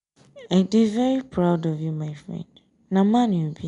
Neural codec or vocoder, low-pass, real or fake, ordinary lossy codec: none; 10.8 kHz; real; none